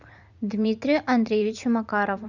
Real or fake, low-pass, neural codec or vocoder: fake; 7.2 kHz; vocoder, 44.1 kHz, 80 mel bands, Vocos